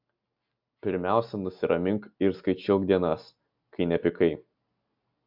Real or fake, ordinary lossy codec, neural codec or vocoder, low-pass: real; AAC, 48 kbps; none; 5.4 kHz